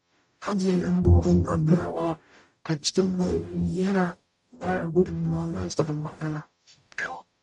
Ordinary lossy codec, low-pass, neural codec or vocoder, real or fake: none; 10.8 kHz; codec, 44.1 kHz, 0.9 kbps, DAC; fake